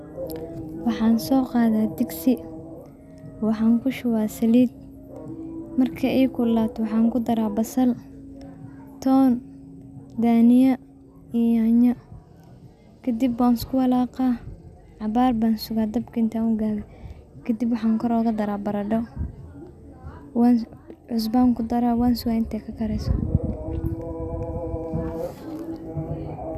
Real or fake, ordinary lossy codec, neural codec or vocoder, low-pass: real; none; none; 14.4 kHz